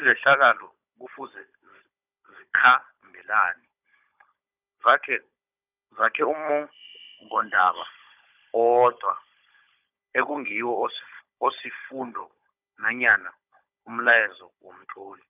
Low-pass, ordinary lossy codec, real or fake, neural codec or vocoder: 3.6 kHz; none; fake; codec, 16 kHz, 16 kbps, FunCodec, trained on Chinese and English, 50 frames a second